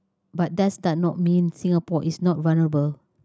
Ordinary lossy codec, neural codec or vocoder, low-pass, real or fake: none; none; none; real